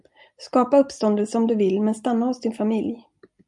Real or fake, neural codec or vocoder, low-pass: real; none; 10.8 kHz